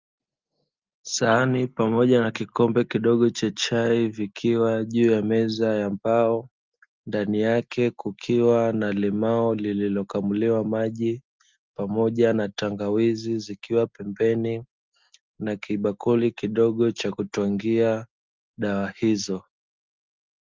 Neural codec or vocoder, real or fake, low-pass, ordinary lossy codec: none; real; 7.2 kHz; Opus, 24 kbps